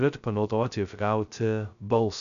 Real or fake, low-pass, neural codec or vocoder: fake; 7.2 kHz; codec, 16 kHz, 0.2 kbps, FocalCodec